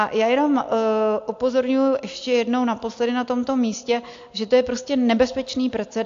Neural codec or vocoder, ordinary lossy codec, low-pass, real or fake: none; AAC, 64 kbps; 7.2 kHz; real